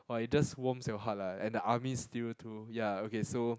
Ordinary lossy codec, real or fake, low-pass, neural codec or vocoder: none; real; none; none